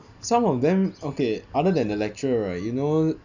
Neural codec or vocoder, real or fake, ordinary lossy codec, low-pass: none; real; none; 7.2 kHz